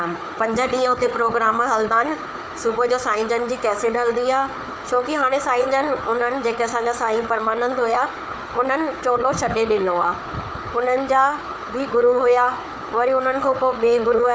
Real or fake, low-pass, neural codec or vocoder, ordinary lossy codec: fake; none; codec, 16 kHz, 4 kbps, FunCodec, trained on Chinese and English, 50 frames a second; none